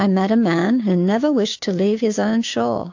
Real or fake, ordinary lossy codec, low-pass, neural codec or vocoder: fake; AAC, 48 kbps; 7.2 kHz; codec, 16 kHz, 6 kbps, DAC